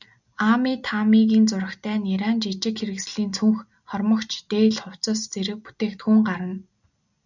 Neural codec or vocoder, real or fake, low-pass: none; real; 7.2 kHz